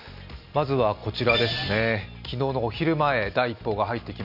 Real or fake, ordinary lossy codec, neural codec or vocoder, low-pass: real; none; none; 5.4 kHz